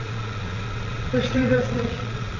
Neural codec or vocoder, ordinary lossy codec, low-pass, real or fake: vocoder, 22.05 kHz, 80 mel bands, Vocos; none; 7.2 kHz; fake